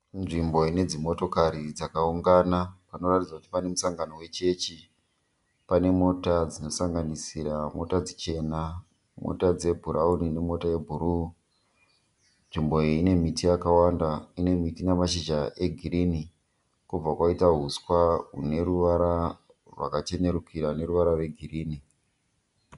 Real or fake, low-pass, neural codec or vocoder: real; 10.8 kHz; none